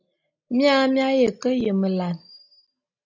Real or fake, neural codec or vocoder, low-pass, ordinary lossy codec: real; none; 7.2 kHz; AAC, 48 kbps